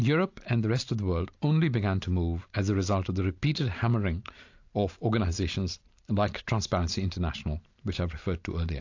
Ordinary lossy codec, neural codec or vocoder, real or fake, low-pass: AAC, 48 kbps; none; real; 7.2 kHz